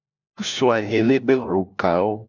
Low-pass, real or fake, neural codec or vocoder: 7.2 kHz; fake; codec, 16 kHz, 1 kbps, FunCodec, trained on LibriTTS, 50 frames a second